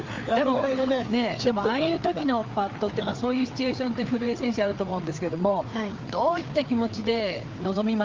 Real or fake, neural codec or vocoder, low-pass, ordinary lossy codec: fake; codec, 16 kHz, 4 kbps, FreqCodec, larger model; 7.2 kHz; Opus, 32 kbps